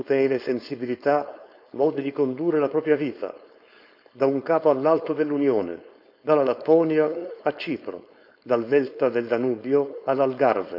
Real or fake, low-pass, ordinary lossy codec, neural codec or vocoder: fake; 5.4 kHz; none; codec, 16 kHz, 4.8 kbps, FACodec